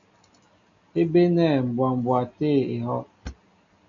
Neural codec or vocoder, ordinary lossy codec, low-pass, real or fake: none; MP3, 64 kbps; 7.2 kHz; real